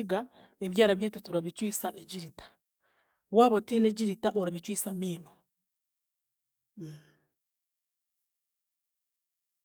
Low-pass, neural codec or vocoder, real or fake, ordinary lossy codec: none; codec, 44.1 kHz, 7.8 kbps, DAC; fake; none